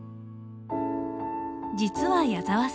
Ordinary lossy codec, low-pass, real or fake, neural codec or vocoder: none; none; real; none